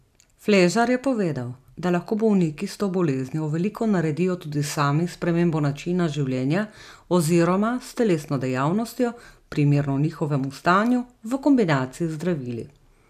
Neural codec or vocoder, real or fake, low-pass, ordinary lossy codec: none; real; 14.4 kHz; none